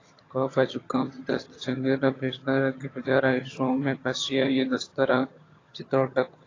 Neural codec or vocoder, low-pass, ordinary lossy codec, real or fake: vocoder, 22.05 kHz, 80 mel bands, HiFi-GAN; 7.2 kHz; AAC, 32 kbps; fake